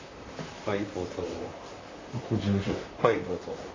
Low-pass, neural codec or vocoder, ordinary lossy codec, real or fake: 7.2 kHz; vocoder, 44.1 kHz, 128 mel bands, Pupu-Vocoder; AAC, 32 kbps; fake